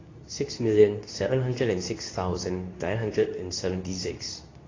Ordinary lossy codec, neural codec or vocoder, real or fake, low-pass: AAC, 32 kbps; codec, 24 kHz, 0.9 kbps, WavTokenizer, medium speech release version 2; fake; 7.2 kHz